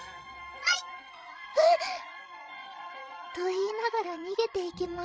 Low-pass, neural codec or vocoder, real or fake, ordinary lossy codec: none; codec, 16 kHz, 16 kbps, FreqCodec, larger model; fake; none